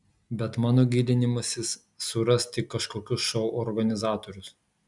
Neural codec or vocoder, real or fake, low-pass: none; real; 10.8 kHz